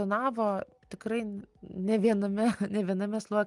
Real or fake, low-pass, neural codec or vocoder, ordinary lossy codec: real; 10.8 kHz; none; Opus, 32 kbps